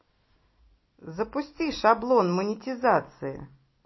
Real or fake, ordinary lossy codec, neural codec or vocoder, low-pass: real; MP3, 24 kbps; none; 7.2 kHz